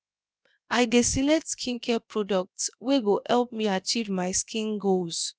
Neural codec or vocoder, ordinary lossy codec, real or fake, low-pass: codec, 16 kHz, 0.7 kbps, FocalCodec; none; fake; none